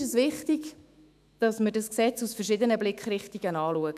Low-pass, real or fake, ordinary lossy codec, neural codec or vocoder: 14.4 kHz; fake; none; autoencoder, 48 kHz, 128 numbers a frame, DAC-VAE, trained on Japanese speech